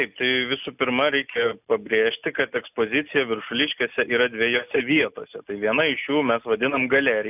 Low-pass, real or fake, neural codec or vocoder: 3.6 kHz; real; none